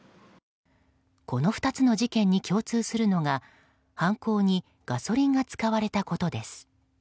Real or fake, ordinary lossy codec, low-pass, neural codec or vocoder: real; none; none; none